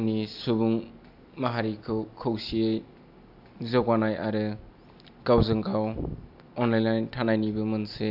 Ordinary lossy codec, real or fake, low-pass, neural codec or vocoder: AAC, 48 kbps; real; 5.4 kHz; none